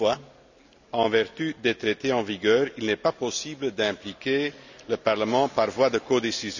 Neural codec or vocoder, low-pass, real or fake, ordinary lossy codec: none; 7.2 kHz; real; none